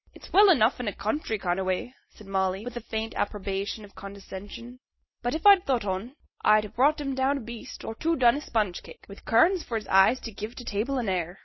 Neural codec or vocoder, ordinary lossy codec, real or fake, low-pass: none; MP3, 24 kbps; real; 7.2 kHz